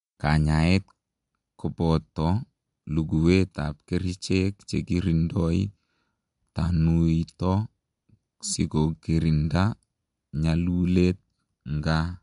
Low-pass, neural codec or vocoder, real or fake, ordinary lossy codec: 10.8 kHz; none; real; MP3, 64 kbps